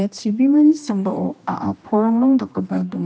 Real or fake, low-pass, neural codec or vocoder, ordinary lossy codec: fake; none; codec, 16 kHz, 1 kbps, X-Codec, HuBERT features, trained on general audio; none